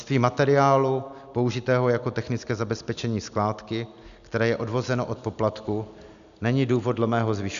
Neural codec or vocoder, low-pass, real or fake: none; 7.2 kHz; real